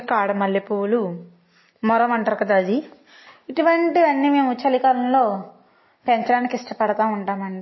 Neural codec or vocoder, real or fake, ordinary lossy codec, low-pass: none; real; MP3, 24 kbps; 7.2 kHz